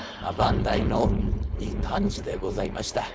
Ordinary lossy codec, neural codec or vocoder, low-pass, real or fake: none; codec, 16 kHz, 4.8 kbps, FACodec; none; fake